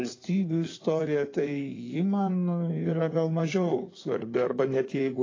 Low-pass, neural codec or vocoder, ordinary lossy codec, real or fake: 7.2 kHz; codec, 16 kHz in and 24 kHz out, 2.2 kbps, FireRedTTS-2 codec; AAC, 32 kbps; fake